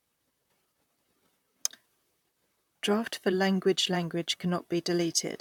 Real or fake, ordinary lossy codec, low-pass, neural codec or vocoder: fake; none; 19.8 kHz; vocoder, 44.1 kHz, 128 mel bands, Pupu-Vocoder